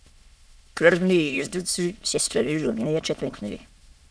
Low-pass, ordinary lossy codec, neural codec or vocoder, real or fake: none; none; autoencoder, 22.05 kHz, a latent of 192 numbers a frame, VITS, trained on many speakers; fake